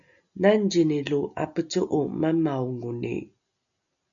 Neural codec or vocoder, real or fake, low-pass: none; real; 7.2 kHz